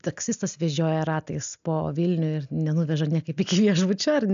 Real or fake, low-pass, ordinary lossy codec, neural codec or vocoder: real; 7.2 kHz; AAC, 96 kbps; none